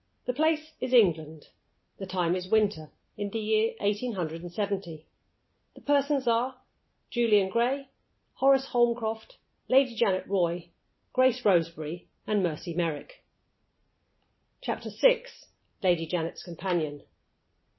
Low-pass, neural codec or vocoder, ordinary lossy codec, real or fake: 7.2 kHz; none; MP3, 24 kbps; real